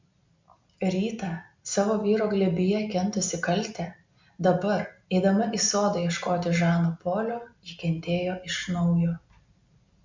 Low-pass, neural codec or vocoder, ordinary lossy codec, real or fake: 7.2 kHz; none; MP3, 64 kbps; real